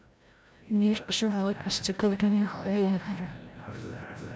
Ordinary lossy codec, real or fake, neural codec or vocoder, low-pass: none; fake; codec, 16 kHz, 0.5 kbps, FreqCodec, larger model; none